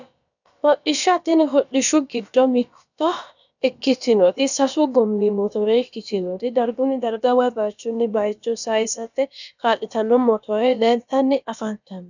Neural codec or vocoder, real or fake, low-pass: codec, 16 kHz, about 1 kbps, DyCAST, with the encoder's durations; fake; 7.2 kHz